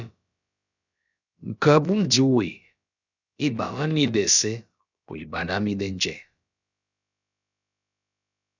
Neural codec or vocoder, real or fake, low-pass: codec, 16 kHz, about 1 kbps, DyCAST, with the encoder's durations; fake; 7.2 kHz